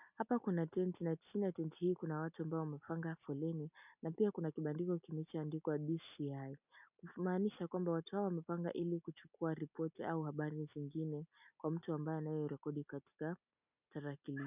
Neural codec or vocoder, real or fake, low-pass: none; real; 3.6 kHz